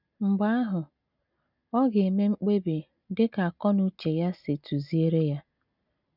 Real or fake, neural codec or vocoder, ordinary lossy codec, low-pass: real; none; none; 5.4 kHz